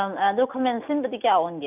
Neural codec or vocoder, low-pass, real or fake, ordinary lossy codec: none; 3.6 kHz; real; none